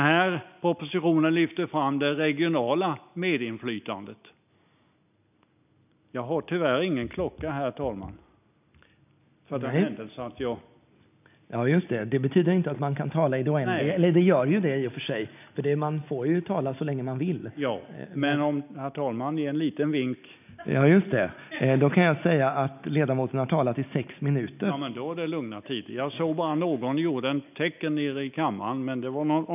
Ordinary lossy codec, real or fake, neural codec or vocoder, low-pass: none; real; none; 3.6 kHz